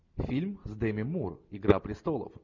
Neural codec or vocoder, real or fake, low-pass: none; real; 7.2 kHz